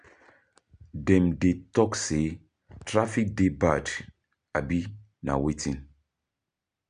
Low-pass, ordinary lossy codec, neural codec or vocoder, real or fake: 9.9 kHz; none; none; real